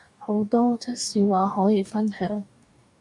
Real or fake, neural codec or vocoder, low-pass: fake; codec, 44.1 kHz, 2.6 kbps, DAC; 10.8 kHz